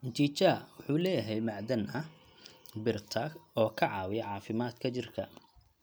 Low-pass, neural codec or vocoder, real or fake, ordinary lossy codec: none; none; real; none